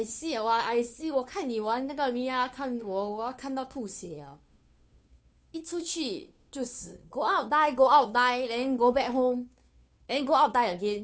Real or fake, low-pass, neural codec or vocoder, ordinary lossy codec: fake; none; codec, 16 kHz, 2 kbps, FunCodec, trained on Chinese and English, 25 frames a second; none